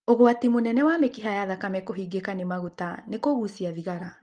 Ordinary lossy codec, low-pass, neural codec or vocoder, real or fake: Opus, 24 kbps; 9.9 kHz; none; real